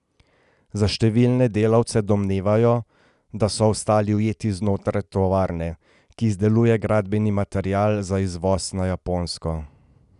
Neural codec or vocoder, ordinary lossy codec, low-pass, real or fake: none; none; 10.8 kHz; real